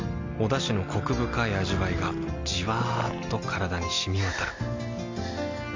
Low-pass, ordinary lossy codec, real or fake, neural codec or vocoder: 7.2 kHz; MP3, 64 kbps; real; none